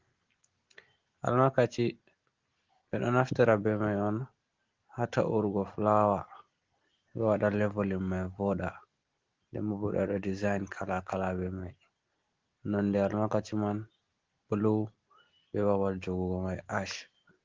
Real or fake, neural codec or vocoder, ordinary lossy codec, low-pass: real; none; Opus, 16 kbps; 7.2 kHz